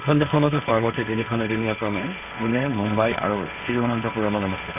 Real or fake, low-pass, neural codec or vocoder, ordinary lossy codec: fake; 3.6 kHz; codec, 16 kHz, 1.1 kbps, Voila-Tokenizer; none